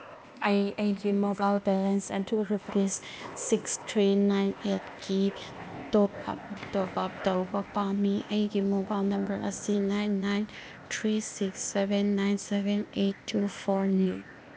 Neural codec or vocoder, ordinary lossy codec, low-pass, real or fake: codec, 16 kHz, 0.8 kbps, ZipCodec; none; none; fake